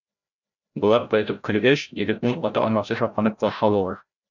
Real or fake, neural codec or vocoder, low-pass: fake; codec, 16 kHz, 0.5 kbps, FreqCodec, larger model; 7.2 kHz